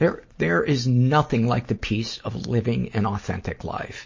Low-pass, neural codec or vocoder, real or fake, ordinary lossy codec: 7.2 kHz; none; real; MP3, 32 kbps